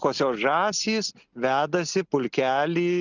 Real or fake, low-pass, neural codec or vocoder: real; 7.2 kHz; none